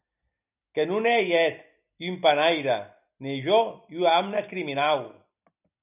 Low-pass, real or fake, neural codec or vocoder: 3.6 kHz; real; none